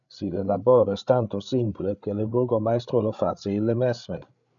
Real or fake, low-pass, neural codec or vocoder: fake; 7.2 kHz; codec, 16 kHz, 8 kbps, FreqCodec, larger model